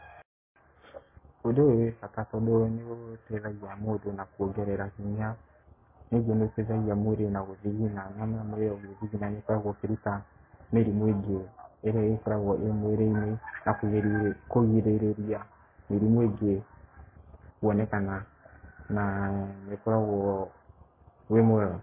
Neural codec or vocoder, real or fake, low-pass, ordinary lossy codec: none; real; 3.6 kHz; MP3, 16 kbps